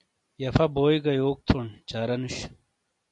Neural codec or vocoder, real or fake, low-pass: none; real; 10.8 kHz